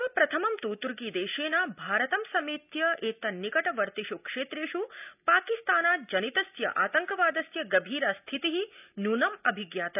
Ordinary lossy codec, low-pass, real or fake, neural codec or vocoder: none; 3.6 kHz; real; none